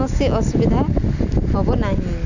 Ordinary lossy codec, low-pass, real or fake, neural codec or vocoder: none; 7.2 kHz; real; none